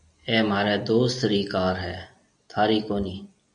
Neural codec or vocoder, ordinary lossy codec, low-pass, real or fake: none; MP3, 64 kbps; 9.9 kHz; real